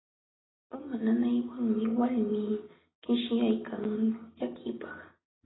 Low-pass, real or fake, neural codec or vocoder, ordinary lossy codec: 7.2 kHz; real; none; AAC, 16 kbps